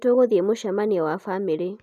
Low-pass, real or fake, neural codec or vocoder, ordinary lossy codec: 14.4 kHz; real; none; none